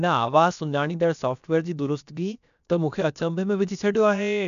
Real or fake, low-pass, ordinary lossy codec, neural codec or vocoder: fake; 7.2 kHz; none; codec, 16 kHz, 0.7 kbps, FocalCodec